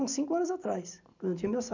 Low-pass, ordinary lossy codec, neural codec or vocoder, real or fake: 7.2 kHz; none; none; real